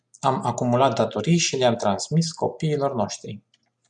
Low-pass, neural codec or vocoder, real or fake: 9.9 kHz; none; real